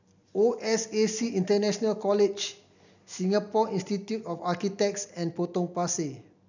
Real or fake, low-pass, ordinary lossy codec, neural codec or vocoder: real; 7.2 kHz; none; none